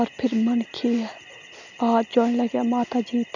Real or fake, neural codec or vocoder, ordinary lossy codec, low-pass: real; none; none; 7.2 kHz